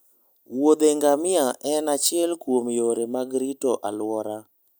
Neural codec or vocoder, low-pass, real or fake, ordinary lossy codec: none; none; real; none